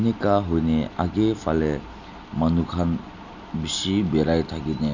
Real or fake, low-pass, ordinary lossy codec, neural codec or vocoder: real; 7.2 kHz; none; none